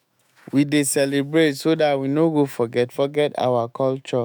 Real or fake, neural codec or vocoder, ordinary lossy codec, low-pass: fake; autoencoder, 48 kHz, 128 numbers a frame, DAC-VAE, trained on Japanese speech; none; none